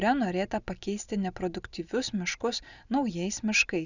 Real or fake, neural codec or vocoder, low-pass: real; none; 7.2 kHz